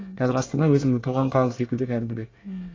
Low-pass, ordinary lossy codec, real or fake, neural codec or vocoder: 7.2 kHz; AAC, 32 kbps; fake; codec, 24 kHz, 1 kbps, SNAC